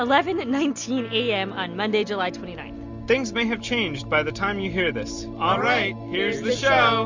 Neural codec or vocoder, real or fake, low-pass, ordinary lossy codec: none; real; 7.2 kHz; MP3, 64 kbps